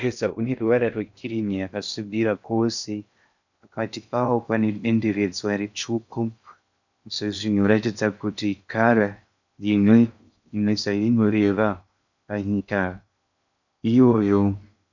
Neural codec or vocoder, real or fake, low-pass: codec, 16 kHz in and 24 kHz out, 0.6 kbps, FocalCodec, streaming, 2048 codes; fake; 7.2 kHz